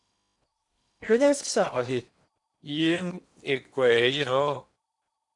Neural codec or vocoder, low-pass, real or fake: codec, 16 kHz in and 24 kHz out, 0.8 kbps, FocalCodec, streaming, 65536 codes; 10.8 kHz; fake